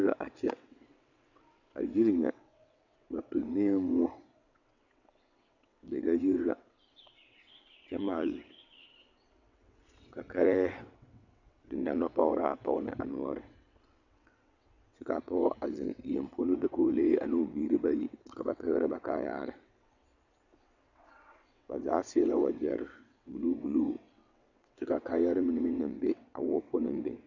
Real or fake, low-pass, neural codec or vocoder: fake; 7.2 kHz; vocoder, 22.05 kHz, 80 mel bands, WaveNeXt